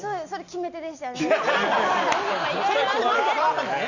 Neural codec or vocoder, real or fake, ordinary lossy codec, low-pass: none; real; none; 7.2 kHz